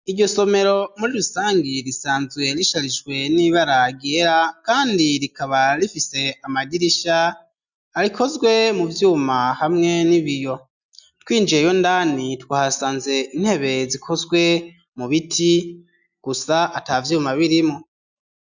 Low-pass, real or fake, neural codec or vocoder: 7.2 kHz; real; none